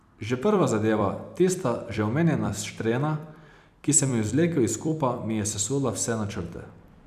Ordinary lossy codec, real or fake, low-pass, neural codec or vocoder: none; fake; 14.4 kHz; vocoder, 44.1 kHz, 128 mel bands every 256 samples, BigVGAN v2